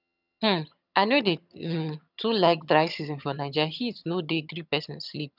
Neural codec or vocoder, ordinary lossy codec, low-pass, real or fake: vocoder, 22.05 kHz, 80 mel bands, HiFi-GAN; none; 5.4 kHz; fake